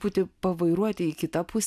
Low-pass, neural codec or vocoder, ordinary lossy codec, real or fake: 14.4 kHz; none; AAC, 96 kbps; real